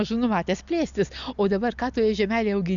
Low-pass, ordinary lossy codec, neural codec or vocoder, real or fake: 7.2 kHz; Opus, 64 kbps; none; real